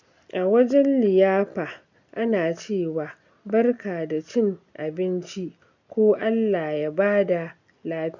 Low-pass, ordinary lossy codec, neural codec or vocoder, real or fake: 7.2 kHz; none; none; real